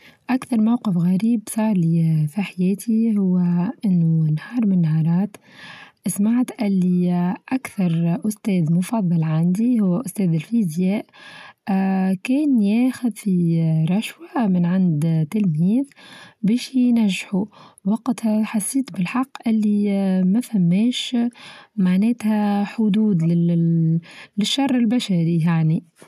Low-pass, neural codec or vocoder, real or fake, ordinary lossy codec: 14.4 kHz; none; real; none